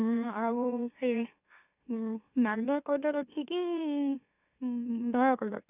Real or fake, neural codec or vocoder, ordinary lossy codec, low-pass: fake; autoencoder, 44.1 kHz, a latent of 192 numbers a frame, MeloTTS; none; 3.6 kHz